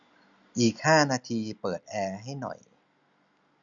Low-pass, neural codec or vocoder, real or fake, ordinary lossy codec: 7.2 kHz; none; real; none